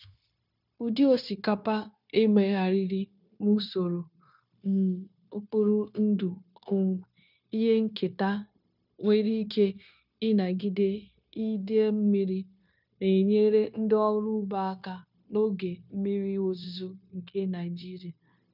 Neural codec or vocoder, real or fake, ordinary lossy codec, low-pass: codec, 16 kHz, 0.9 kbps, LongCat-Audio-Codec; fake; none; 5.4 kHz